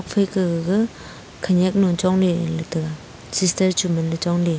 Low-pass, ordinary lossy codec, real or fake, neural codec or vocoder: none; none; real; none